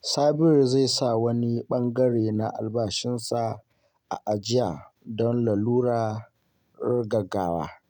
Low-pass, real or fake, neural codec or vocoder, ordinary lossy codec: 19.8 kHz; real; none; none